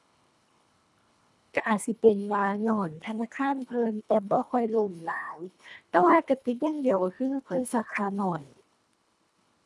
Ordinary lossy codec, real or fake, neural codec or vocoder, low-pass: none; fake; codec, 24 kHz, 1.5 kbps, HILCodec; none